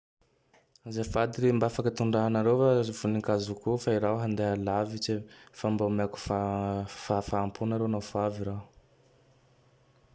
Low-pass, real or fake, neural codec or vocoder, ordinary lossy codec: none; real; none; none